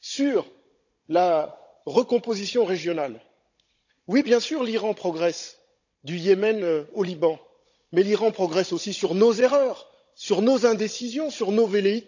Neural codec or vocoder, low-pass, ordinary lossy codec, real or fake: codec, 16 kHz, 16 kbps, FunCodec, trained on Chinese and English, 50 frames a second; 7.2 kHz; none; fake